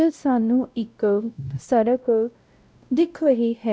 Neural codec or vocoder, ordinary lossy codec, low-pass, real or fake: codec, 16 kHz, 0.5 kbps, X-Codec, WavLM features, trained on Multilingual LibriSpeech; none; none; fake